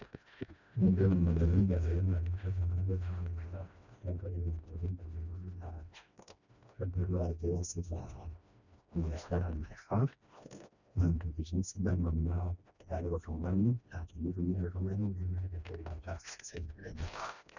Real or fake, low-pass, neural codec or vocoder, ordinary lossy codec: fake; 7.2 kHz; codec, 16 kHz, 1 kbps, FreqCodec, smaller model; none